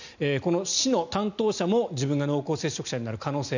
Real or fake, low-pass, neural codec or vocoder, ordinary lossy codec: real; 7.2 kHz; none; none